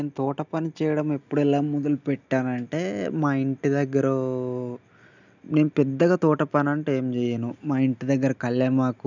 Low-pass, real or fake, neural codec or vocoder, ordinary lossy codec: 7.2 kHz; real; none; none